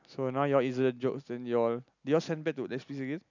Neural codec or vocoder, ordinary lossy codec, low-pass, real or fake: none; none; 7.2 kHz; real